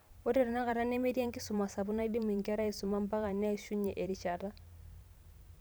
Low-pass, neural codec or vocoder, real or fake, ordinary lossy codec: none; vocoder, 44.1 kHz, 128 mel bands every 512 samples, BigVGAN v2; fake; none